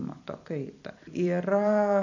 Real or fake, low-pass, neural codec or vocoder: fake; 7.2 kHz; vocoder, 44.1 kHz, 128 mel bands every 256 samples, BigVGAN v2